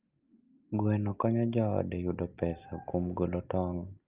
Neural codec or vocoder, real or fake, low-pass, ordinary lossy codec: none; real; 3.6 kHz; Opus, 32 kbps